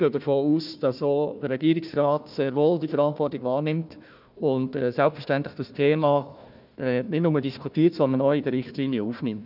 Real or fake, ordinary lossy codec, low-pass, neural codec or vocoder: fake; none; 5.4 kHz; codec, 16 kHz, 1 kbps, FunCodec, trained on Chinese and English, 50 frames a second